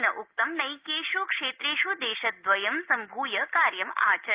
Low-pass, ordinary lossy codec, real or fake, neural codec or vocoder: 3.6 kHz; Opus, 32 kbps; real; none